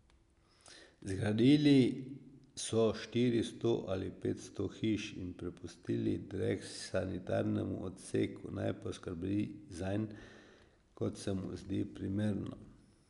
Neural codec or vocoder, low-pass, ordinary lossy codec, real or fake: none; 10.8 kHz; none; real